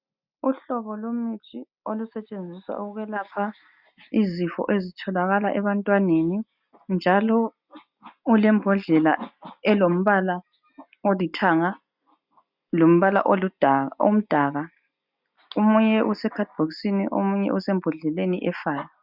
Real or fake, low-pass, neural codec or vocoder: real; 5.4 kHz; none